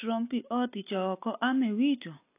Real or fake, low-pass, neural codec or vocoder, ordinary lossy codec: real; 3.6 kHz; none; AAC, 24 kbps